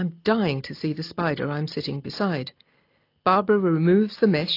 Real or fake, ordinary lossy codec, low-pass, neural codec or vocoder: real; AAC, 32 kbps; 5.4 kHz; none